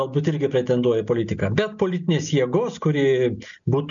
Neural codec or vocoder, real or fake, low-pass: none; real; 7.2 kHz